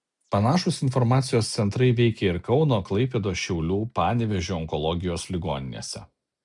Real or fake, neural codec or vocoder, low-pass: real; none; 10.8 kHz